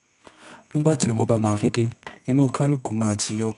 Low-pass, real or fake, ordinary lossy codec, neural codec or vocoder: 10.8 kHz; fake; none; codec, 24 kHz, 0.9 kbps, WavTokenizer, medium music audio release